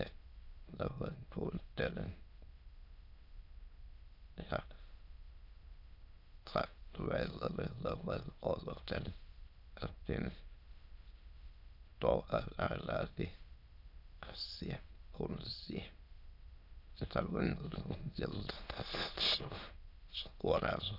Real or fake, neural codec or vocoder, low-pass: fake; autoencoder, 22.05 kHz, a latent of 192 numbers a frame, VITS, trained on many speakers; 5.4 kHz